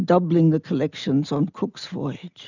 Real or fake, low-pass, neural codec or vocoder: real; 7.2 kHz; none